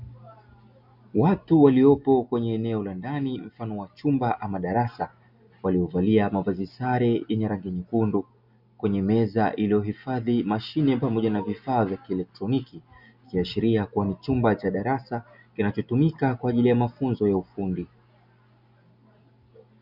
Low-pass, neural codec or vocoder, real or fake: 5.4 kHz; none; real